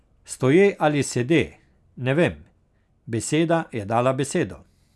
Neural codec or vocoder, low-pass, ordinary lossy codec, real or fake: none; none; none; real